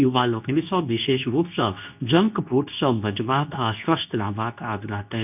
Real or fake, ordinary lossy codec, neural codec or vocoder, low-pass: fake; none; codec, 24 kHz, 0.9 kbps, WavTokenizer, medium speech release version 2; 3.6 kHz